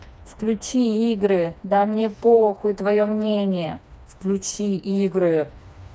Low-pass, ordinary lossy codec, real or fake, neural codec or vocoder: none; none; fake; codec, 16 kHz, 2 kbps, FreqCodec, smaller model